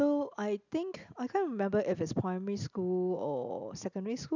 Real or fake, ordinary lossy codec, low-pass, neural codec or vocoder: real; none; 7.2 kHz; none